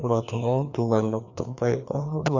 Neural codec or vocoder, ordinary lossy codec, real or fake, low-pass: codec, 16 kHz, 2 kbps, FreqCodec, larger model; none; fake; 7.2 kHz